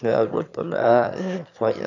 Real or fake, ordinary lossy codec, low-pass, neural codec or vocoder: fake; none; 7.2 kHz; autoencoder, 22.05 kHz, a latent of 192 numbers a frame, VITS, trained on one speaker